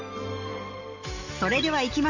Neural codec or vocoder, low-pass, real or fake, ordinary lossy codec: none; 7.2 kHz; real; none